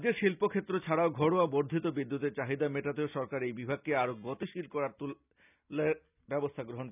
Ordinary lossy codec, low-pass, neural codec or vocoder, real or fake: none; 3.6 kHz; none; real